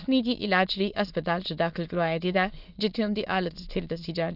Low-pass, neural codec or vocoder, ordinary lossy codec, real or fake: 5.4 kHz; autoencoder, 22.05 kHz, a latent of 192 numbers a frame, VITS, trained on many speakers; none; fake